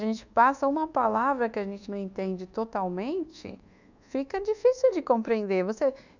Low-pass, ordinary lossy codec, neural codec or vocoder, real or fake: 7.2 kHz; none; codec, 24 kHz, 1.2 kbps, DualCodec; fake